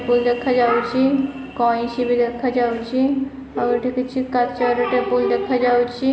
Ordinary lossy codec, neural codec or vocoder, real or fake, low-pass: none; none; real; none